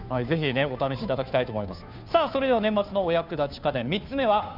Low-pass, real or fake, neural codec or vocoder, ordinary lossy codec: 5.4 kHz; fake; codec, 16 kHz, 2 kbps, FunCodec, trained on Chinese and English, 25 frames a second; none